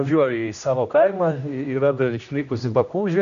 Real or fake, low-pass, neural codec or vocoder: fake; 7.2 kHz; codec, 16 kHz, 1 kbps, X-Codec, HuBERT features, trained on general audio